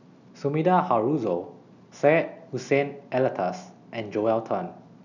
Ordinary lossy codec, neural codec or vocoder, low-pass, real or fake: none; none; 7.2 kHz; real